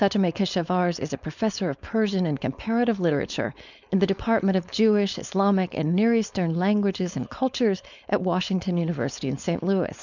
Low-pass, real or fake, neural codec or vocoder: 7.2 kHz; fake; codec, 16 kHz, 4.8 kbps, FACodec